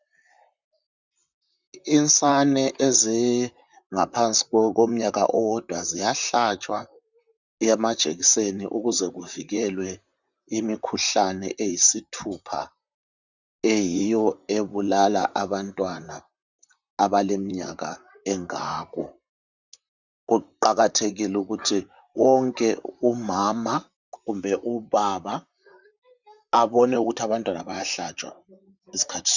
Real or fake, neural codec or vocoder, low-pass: fake; vocoder, 44.1 kHz, 128 mel bands, Pupu-Vocoder; 7.2 kHz